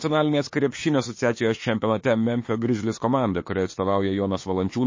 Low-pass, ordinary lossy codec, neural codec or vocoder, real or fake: 7.2 kHz; MP3, 32 kbps; autoencoder, 48 kHz, 32 numbers a frame, DAC-VAE, trained on Japanese speech; fake